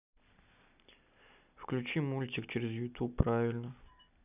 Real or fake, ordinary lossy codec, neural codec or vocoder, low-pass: real; none; none; 3.6 kHz